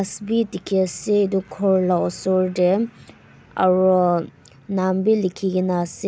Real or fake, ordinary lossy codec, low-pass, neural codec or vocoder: real; none; none; none